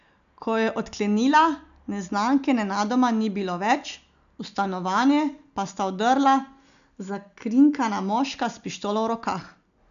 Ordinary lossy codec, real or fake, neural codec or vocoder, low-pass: none; real; none; 7.2 kHz